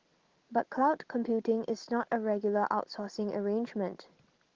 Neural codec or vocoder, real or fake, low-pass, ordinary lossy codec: none; real; 7.2 kHz; Opus, 16 kbps